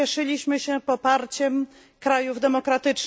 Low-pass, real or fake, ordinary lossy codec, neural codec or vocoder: none; real; none; none